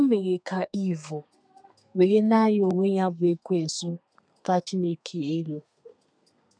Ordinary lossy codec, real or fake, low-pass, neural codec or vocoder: none; fake; 9.9 kHz; codec, 32 kHz, 1.9 kbps, SNAC